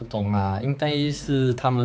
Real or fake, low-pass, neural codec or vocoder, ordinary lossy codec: fake; none; codec, 16 kHz, 4 kbps, X-Codec, HuBERT features, trained on balanced general audio; none